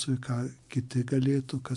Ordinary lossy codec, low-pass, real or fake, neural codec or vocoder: MP3, 64 kbps; 10.8 kHz; real; none